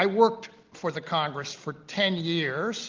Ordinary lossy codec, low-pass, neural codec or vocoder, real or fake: Opus, 32 kbps; 7.2 kHz; none; real